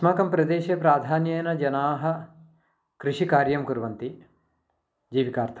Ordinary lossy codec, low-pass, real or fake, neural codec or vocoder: none; none; real; none